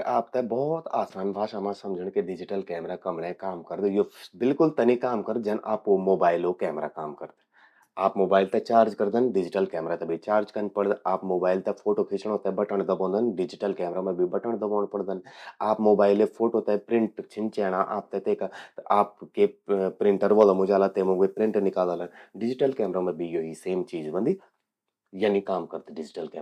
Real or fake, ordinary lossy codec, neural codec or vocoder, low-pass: real; none; none; 14.4 kHz